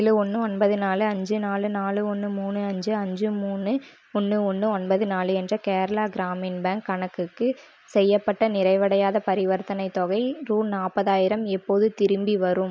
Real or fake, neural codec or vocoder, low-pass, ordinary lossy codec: real; none; none; none